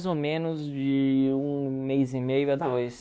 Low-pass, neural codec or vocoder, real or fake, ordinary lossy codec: none; codec, 16 kHz, 2 kbps, X-Codec, WavLM features, trained on Multilingual LibriSpeech; fake; none